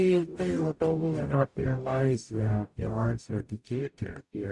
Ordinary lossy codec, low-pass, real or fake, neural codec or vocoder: Opus, 64 kbps; 10.8 kHz; fake; codec, 44.1 kHz, 0.9 kbps, DAC